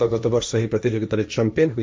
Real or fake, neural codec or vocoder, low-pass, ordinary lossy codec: fake; codec, 16 kHz, 1.1 kbps, Voila-Tokenizer; none; none